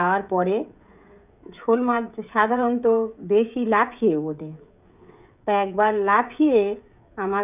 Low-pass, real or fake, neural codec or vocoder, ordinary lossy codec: 3.6 kHz; fake; codec, 16 kHz, 16 kbps, FreqCodec, smaller model; none